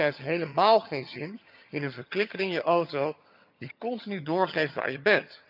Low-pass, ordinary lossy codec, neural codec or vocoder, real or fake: 5.4 kHz; none; vocoder, 22.05 kHz, 80 mel bands, HiFi-GAN; fake